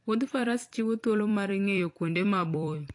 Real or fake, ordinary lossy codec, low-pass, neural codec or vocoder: fake; AAC, 48 kbps; 10.8 kHz; vocoder, 44.1 kHz, 128 mel bands every 256 samples, BigVGAN v2